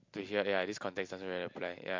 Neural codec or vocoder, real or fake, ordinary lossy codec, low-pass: none; real; MP3, 48 kbps; 7.2 kHz